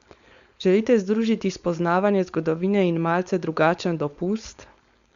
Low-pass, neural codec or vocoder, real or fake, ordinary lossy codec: 7.2 kHz; codec, 16 kHz, 4.8 kbps, FACodec; fake; Opus, 64 kbps